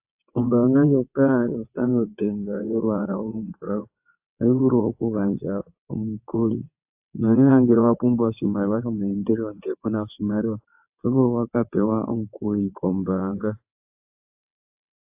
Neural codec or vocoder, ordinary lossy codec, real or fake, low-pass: vocoder, 22.05 kHz, 80 mel bands, WaveNeXt; AAC, 32 kbps; fake; 3.6 kHz